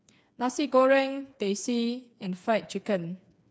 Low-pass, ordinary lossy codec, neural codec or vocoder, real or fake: none; none; codec, 16 kHz, 4 kbps, FreqCodec, smaller model; fake